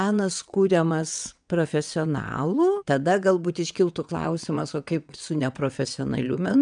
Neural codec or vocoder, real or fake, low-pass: vocoder, 22.05 kHz, 80 mel bands, WaveNeXt; fake; 9.9 kHz